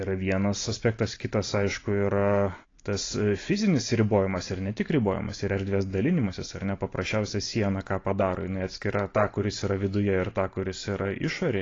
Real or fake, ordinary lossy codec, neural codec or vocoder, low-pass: real; AAC, 32 kbps; none; 7.2 kHz